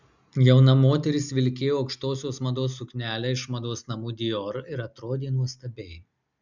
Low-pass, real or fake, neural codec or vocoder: 7.2 kHz; real; none